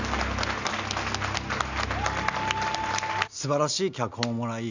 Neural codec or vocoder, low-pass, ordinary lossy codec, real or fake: none; 7.2 kHz; none; real